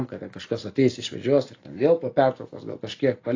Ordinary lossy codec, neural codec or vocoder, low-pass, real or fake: AAC, 32 kbps; none; 7.2 kHz; real